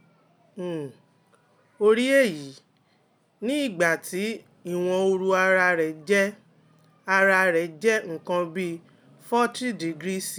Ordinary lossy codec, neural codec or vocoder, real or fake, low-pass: none; none; real; none